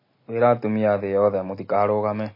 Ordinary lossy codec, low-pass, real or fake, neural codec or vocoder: MP3, 24 kbps; 5.4 kHz; real; none